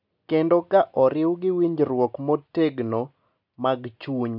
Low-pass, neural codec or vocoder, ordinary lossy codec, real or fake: 5.4 kHz; none; none; real